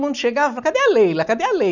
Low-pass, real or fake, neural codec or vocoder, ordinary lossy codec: 7.2 kHz; real; none; none